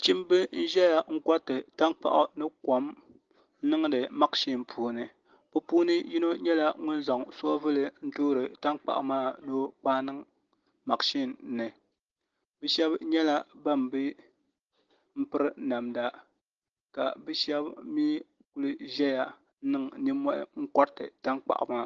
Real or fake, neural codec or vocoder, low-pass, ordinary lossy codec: real; none; 7.2 kHz; Opus, 16 kbps